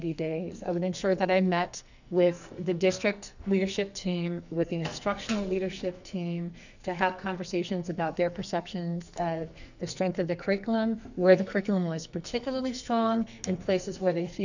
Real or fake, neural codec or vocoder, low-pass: fake; codec, 44.1 kHz, 2.6 kbps, SNAC; 7.2 kHz